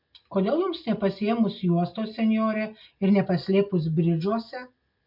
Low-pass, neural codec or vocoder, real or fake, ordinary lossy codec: 5.4 kHz; none; real; MP3, 48 kbps